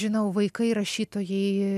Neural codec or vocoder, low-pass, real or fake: none; 14.4 kHz; real